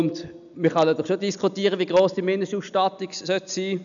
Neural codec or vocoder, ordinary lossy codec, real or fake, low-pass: none; MP3, 96 kbps; real; 7.2 kHz